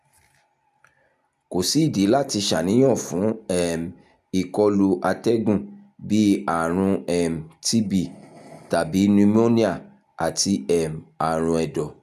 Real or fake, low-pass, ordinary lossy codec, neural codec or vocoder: real; 14.4 kHz; none; none